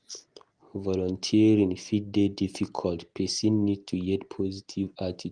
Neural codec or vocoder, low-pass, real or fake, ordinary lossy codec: none; 9.9 kHz; real; Opus, 24 kbps